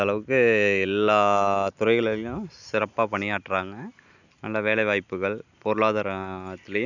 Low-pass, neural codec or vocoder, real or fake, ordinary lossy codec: 7.2 kHz; none; real; none